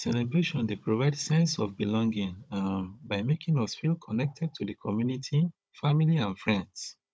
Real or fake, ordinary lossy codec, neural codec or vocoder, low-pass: fake; none; codec, 16 kHz, 16 kbps, FunCodec, trained on Chinese and English, 50 frames a second; none